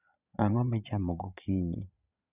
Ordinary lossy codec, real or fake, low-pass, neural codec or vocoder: none; fake; 3.6 kHz; vocoder, 22.05 kHz, 80 mel bands, Vocos